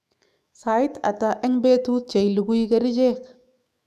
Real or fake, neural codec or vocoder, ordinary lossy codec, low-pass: fake; autoencoder, 48 kHz, 128 numbers a frame, DAC-VAE, trained on Japanese speech; none; 14.4 kHz